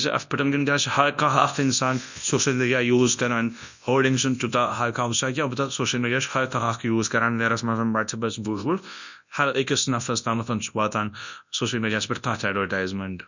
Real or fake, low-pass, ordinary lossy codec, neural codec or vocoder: fake; 7.2 kHz; none; codec, 24 kHz, 0.9 kbps, WavTokenizer, large speech release